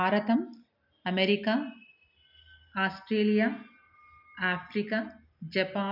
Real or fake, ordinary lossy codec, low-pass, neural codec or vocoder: real; none; 5.4 kHz; none